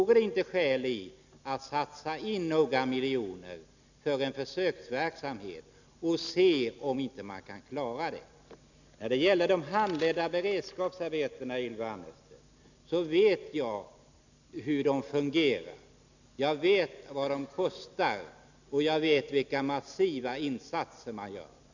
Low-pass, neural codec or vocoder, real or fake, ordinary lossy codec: 7.2 kHz; none; real; none